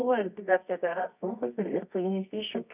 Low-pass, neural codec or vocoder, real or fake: 3.6 kHz; codec, 24 kHz, 0.9 kbps, WavTokenizer, medium music audio release; fake